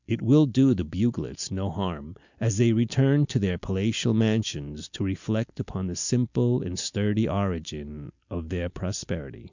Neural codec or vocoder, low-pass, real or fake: none; 7.2 kHz; real